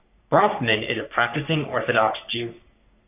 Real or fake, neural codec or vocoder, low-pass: fake; codec, 44.1 kHz, 3.4 kbps, Pupu-Codec; 3.6 kHz